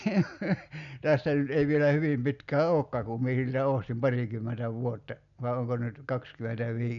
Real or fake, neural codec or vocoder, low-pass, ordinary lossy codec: real; none; 7.2 kHz; none